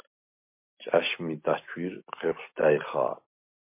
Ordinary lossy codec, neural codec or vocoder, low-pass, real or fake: MP3, 24 kbps; none; 3.6 kHz; real